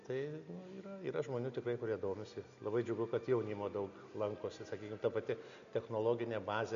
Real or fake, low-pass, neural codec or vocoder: real; 7.2 kHz; none